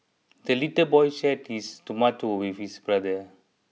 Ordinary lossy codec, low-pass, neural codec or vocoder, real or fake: none; none; none; real